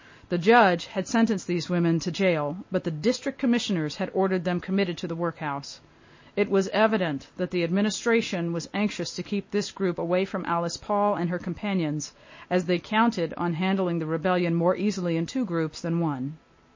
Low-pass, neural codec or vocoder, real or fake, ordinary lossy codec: 7.2 kHz; none; real; MP3, 32 kbps